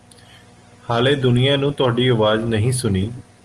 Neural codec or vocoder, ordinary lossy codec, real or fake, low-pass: none; Opus, 24 kbps; real; 10.8 kHz